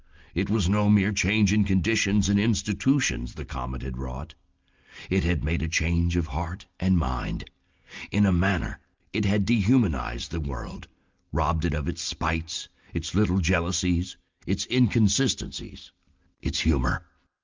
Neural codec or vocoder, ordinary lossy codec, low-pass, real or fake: none; Opus, 24 kbps; 7.2 kHz; real